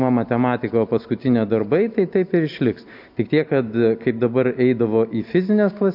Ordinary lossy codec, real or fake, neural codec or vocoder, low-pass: AAC, 48 kbps; real; none; 5.4 kHz